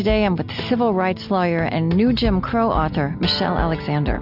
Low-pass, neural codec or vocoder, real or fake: 5.4 kHz; none; real